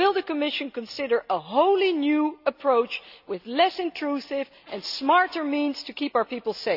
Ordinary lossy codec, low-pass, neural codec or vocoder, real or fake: none; 5.4 kHz; none; real